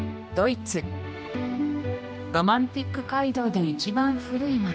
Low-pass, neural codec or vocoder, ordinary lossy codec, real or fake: none; codec, 16 kHz, 1 kbps, X-Codec, HuBERT features, trained on general audio; none; fake